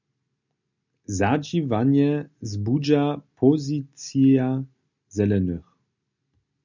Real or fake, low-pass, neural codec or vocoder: real; 7.2 kHz; none